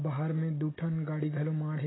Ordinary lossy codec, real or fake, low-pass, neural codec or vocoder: AAC, 16 kbps; real; 7.2 kHz; none